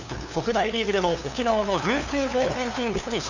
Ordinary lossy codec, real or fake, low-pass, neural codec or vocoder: none; fake; 7.2 kHz; codec, 16 kHz, 2 kbps, FunCodec, trained on LibriTTS, 25 frames a second